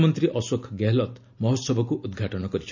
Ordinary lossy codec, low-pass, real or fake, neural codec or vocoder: none; 7.2 kHz; real; none